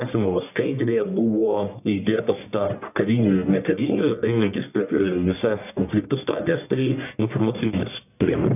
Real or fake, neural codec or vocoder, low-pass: fake; codec, 44.1 kHz, 1.7 kbps, Pupu-Codec; 3.6 kHz